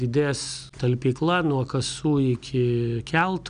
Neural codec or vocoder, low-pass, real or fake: none; 9.9 kHz; real